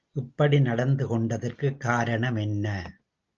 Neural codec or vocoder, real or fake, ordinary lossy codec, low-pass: none; real; Opus, 24 kbps; 7.2 kHz